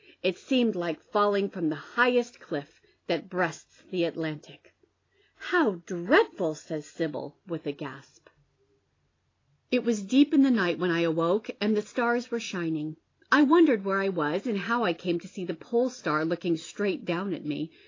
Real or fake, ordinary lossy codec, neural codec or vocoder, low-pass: real; AAC, 32 kbps; none; 7.2 kHz